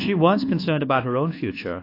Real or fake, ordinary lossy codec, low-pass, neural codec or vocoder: fake; AAC, 32 kbps; 5.4 kHz; codec, 24 kHz, 1.2 kbps, DualCodec